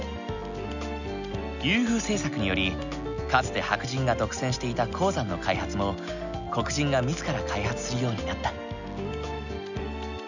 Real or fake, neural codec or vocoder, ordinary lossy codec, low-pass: real; none; none; 7.2 kHz